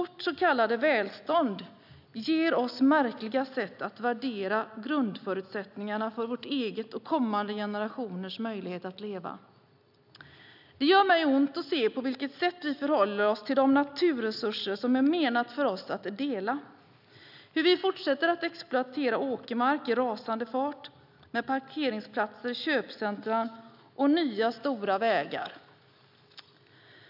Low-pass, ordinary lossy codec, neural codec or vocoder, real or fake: 5.4 kHz; none; none; real